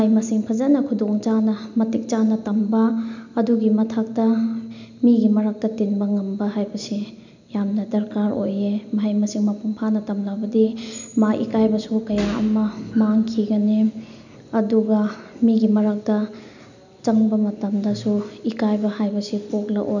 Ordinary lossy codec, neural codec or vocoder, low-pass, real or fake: none; none; 7.2 kHz; real